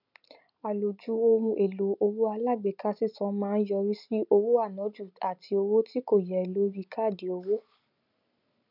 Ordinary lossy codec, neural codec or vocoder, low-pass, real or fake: none; none; 5.4 kHz; real